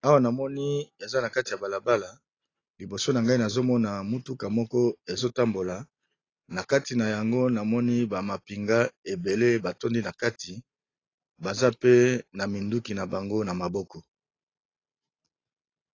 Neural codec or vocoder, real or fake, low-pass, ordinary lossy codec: none; real; 7.2 kHz; AAC, 32 kbps